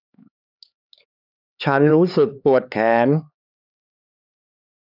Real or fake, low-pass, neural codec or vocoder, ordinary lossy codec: fake; 5.4 kHz; codec, 16 kHz, 4 kbps, X-Codec, HuBERT features, trained on LibriSpeech; none